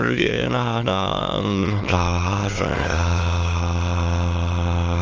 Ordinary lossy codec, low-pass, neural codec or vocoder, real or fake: Opus, 24 kbps; 7.2 kHz; autoencoder, 22.05 kHz, a latent of 192 numbers a frame, VITS, trained on many speakers; fake